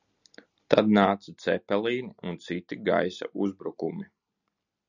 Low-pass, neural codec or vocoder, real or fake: 7.2 kHz; none; real